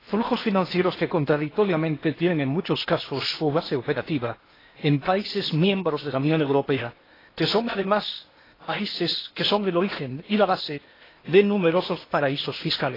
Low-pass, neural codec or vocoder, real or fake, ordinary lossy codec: 5.4 kHz; codec, 16 kHz in and 24 kHz out, 0.8 kbps, FocalCodec, streaming, 65536 codes; fake; AAC, 24 kbps